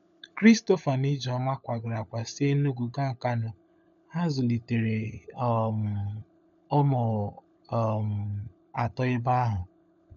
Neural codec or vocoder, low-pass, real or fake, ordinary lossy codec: codec, 16 kHz, 16 kbps, FunCodec, trained on LibriTTS, 50 frames a second; 7.2 kHz; fake; none